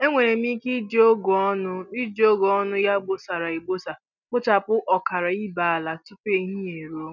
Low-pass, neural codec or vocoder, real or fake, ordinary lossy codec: 7.2 kHz; none; real; none